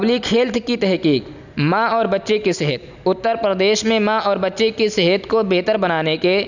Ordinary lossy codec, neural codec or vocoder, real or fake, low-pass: none; none; real; 7.2 kHz